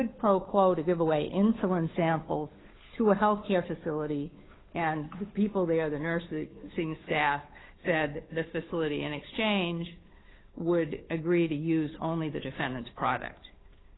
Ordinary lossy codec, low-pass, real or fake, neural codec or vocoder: AAC, 16 kbps; 7.2 kHz; fake; codec, 16 kHz, 8 kbps, FunCodec, trained on Chinese and English, 25 frames a second